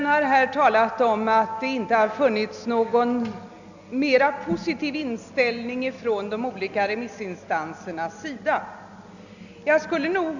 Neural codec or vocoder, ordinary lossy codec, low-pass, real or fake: none; none; 7.2 kHz; real